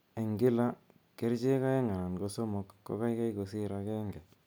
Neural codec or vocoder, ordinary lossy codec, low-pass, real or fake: none; none; none; real